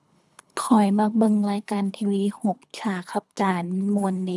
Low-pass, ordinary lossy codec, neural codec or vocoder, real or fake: none; none; codec, 24 kHz, 3 kbps, HILCodec; fake